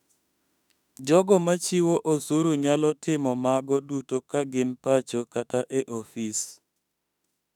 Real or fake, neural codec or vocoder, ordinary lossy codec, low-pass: fake; autoencoder, 48 kHz, 32 numbers a frame, DAC-VAE, trained on Japanese speech; none; 19.8 kHz